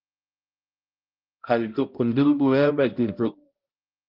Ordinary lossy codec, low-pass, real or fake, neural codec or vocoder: Opus, 32 kbps; 5.4 kHz; fake; codec, 16 kHz, 0.5 kbps, X-Codec, HuBERT features, trained on balanced general audio